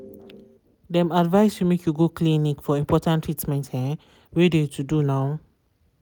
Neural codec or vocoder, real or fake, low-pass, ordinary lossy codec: none; real; none; none